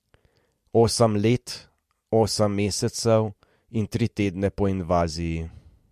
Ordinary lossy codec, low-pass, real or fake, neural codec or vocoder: MP3, 64 kbps; 14.4 kHz; real; none